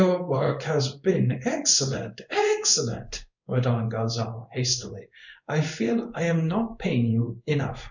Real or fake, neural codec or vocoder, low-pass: fake; codec, 16 kHz in and 24 kHz out, 1 kbps, XY-Tokenizer; 7.2 kHz